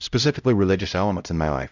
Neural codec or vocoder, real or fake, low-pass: codec, 16 kHz, 0.5 kbps, X-Codec, HuBERT features, trained on LibriSpeech; fake; 7.2 kHz